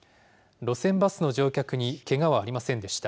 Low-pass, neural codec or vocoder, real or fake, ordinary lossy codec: none; none; real; none